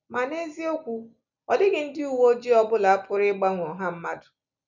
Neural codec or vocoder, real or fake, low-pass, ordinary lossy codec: none; real; 7.2 kHz; none